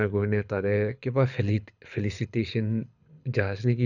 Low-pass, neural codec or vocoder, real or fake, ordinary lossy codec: 7.2 kHz; codec, 24 kHz, 6 kbps, HILCodec; fake; none